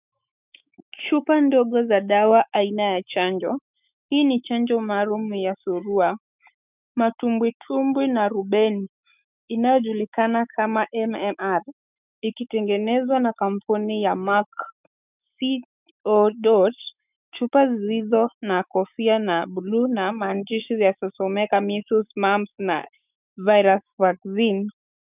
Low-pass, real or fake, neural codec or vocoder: 3.6 kHz; fake; autoencoder, 48 kHz, 128 numbers a frame, DAC-VAE, trained on Japanese speech